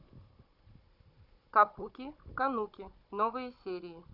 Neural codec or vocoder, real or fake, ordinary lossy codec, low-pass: codec, 16 kHz, 16 kbps, FunCodec, trained on Chinese and English, 50 frames a second; fake; Opus, 64 kbps; 5.4 kHz